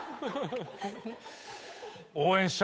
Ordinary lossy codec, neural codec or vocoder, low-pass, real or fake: none; codec, 16 kHz, 8 kbps, FunCodec, trained on Chinese and English, 25 frames a second; none; fake